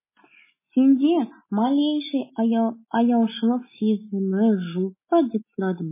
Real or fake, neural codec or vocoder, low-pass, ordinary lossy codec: real; none; 3.6 kHz; MP3, 16 kbps